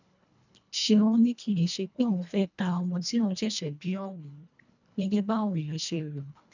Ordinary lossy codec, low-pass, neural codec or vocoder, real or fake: none; 7.2 kHz; codec, 24 kHz, 1.5 kbps, HILCodec; fake